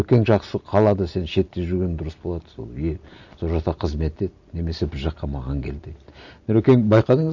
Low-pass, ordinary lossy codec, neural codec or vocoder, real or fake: 7.2 kHz; none; none; real